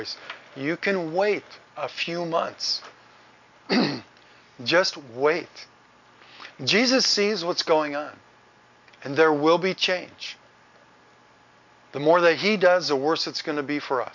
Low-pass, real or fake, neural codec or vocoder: 7.2 kHz; real; none